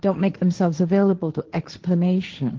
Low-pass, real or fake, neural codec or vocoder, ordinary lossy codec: 7.2 kHz; fake; codec, 16 kHz, 1.1 kbps, Voila-Tokenizer; Opus, 16 kbps